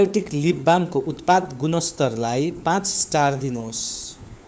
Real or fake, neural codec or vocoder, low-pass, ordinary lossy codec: fake; codec, 16 kHz, 4 kbps, FunCodec, trained on LibriTTS, 50 frames a second; none; none